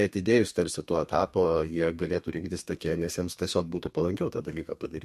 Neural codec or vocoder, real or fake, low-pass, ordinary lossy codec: codec, 32 kHz, 1.9 kbps, SNAC; fake; 14.4 kHz; MP3, 64 kbps